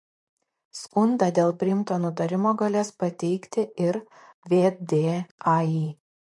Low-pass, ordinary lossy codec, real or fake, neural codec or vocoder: 10.8 kHz; MP3, 48 kbps; fake; vocoder, 44.1 kHz, 128 mel bands, Pupu-Vocoder